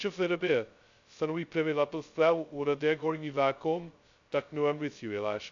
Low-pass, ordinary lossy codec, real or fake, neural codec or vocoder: 7.2 kHz; AAC, 64 kbps; fake; codec, 16 kHz, 0.2 kbps, FocalCodec